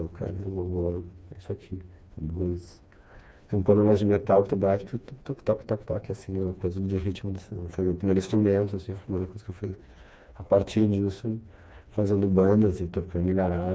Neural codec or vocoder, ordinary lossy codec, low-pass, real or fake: codec, 16 kHz, 2 kbps, FreqCodec, smaller model; none; none; fake